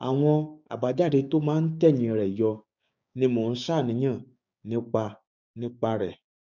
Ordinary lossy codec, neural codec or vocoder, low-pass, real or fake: none; codec, 16 kHz, 6 kbps, DAC; 7.2 kHz; fake